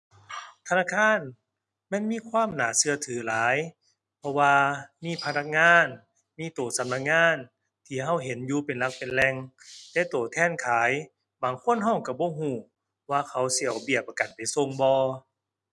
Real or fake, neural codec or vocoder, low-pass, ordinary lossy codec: real; none; none; none